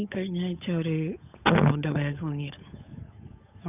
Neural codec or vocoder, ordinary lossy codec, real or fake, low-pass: codec, 16 kHz, 8 kbps, FunCodec, trained on Chinese and English, 25 frames a second; none; fake; 3.6 kHz